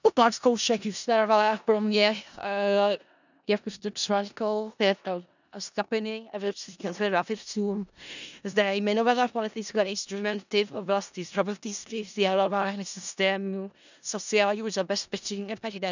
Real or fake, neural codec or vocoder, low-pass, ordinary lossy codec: fake; codec, 16 kHz in and 24 kHz out, 0.4 kbps, LongCat-Audio-Codec, four codebook decoder; 7.2 kHz; none